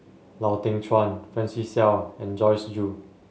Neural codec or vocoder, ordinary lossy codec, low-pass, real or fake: none; none; none; real